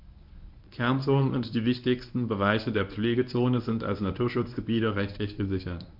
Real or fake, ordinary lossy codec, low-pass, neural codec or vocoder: fake; MP3, 48 kbps; 5.4 kHz; codec, 24 kHz, 0.9 kbps, WavTokenizer, medium speech release version 1